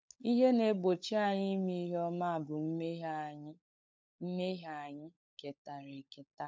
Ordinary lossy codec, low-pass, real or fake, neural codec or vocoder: none; none; fake; codec, 16 kHz, 16 kbps, FunCodec, trained on LibriTTS, 50 frames a second